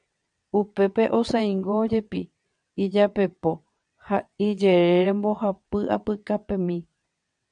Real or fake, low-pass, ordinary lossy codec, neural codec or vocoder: fake; 9.9 kHz; MP3, 96 kbps; vocoder, 22.05 kHz, 80 mel bands, WaveNeXt